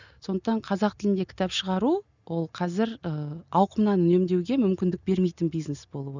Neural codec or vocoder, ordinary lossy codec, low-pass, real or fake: none; none; 7.2 kHz; real